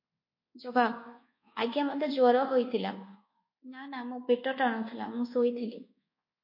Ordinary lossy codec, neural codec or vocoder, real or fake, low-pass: MP3, 32 kbps; codec, 24 kHz, 1.2 kbps, DualCodec; fake; 5.4 kHz